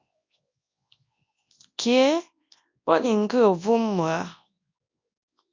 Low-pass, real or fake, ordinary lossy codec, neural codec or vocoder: 7.2 kHz; fake; MP3, 64 kbps; codec, 24 kHz, 0.9 kbps, WavTokenizer, large speech release